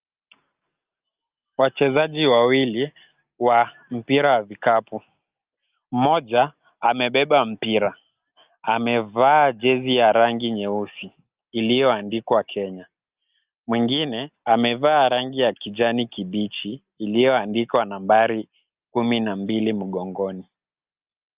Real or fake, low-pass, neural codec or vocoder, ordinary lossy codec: real; 3.6 kHz; none; Opus, 24 kbps